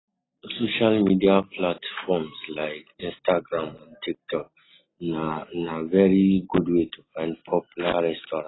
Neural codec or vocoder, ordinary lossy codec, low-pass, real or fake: none; AAC, 16 kbps; 7.2 kHz; real